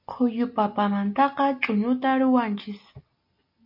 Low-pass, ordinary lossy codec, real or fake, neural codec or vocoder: 5.4 kHz; MP3, 32 kbps; real; none